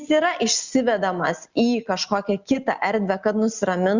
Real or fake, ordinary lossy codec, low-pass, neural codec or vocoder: real; Opus, 64 kbps; 7.2 kHz; none